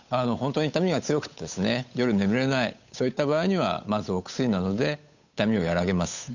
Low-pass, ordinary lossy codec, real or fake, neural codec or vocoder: 7.2 kHz; none; fake; codec, 16 kHz, 8 kbps, FunCodec, trained on Chinese and English, 25 frames a second